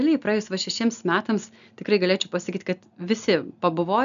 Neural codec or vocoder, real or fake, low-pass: none; real; 7.2 kHz